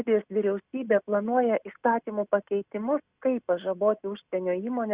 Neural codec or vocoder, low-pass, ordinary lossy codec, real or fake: none; 3.6 kHz; Opus, 64 kbps; real